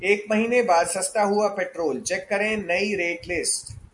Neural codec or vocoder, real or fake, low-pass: none; real; 10.8 kHz